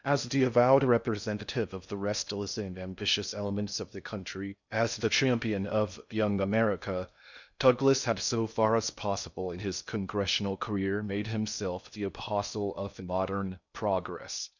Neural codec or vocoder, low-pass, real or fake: codec, 16 kHz in and 24 kHz out, 0.6 kbps, FocalCodec, streaming, 2048 codes; 7.2 kHz; fake